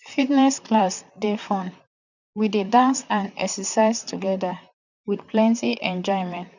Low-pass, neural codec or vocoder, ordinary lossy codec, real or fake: 7.2 kHz; vocoder, 44.1 kHz, 128 mel bands, Pupu-Vocoder; none; fake